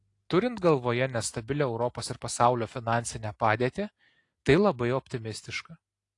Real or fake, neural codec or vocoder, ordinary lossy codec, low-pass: real; none; AAC, 48 kbps; 10.8 kHz